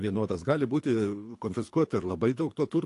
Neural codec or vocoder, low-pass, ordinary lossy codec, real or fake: codec, 24 kHz, 3 kbps, HILCodec; 10.8 kHz; AAC, 48 kbps; fake